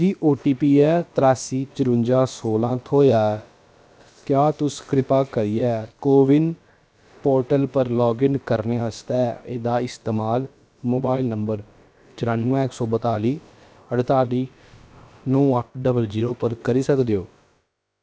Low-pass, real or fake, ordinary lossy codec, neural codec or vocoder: none; fake; none; codec, 16 kHz, about 1 kbps, DyCAST, with the encoder's durations